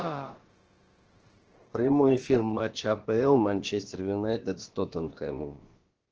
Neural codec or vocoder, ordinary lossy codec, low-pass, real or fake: codec, 16 kHz, about 1 kbps, DyCAST, with the encoder's durations; Opus, 16 kbps; 7.2 kHz; fake